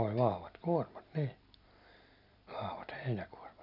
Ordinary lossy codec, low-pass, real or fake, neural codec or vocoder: none; 5.4 kHz; real; none